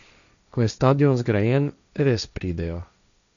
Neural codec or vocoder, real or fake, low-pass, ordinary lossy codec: codec, 16 kHz, 1.1 kbps, Voila-Tokenizer; fake; 7.2 kHz; none